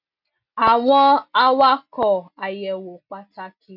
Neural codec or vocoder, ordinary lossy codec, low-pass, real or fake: none; none; 5.4 kHz; real